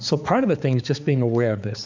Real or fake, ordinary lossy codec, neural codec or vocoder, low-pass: fake; MP3, 64 kbps; codec, 16 kHz, 4 kbps, X-Codec, HuBERT features, trained on balanced general audio; 7.2 kHz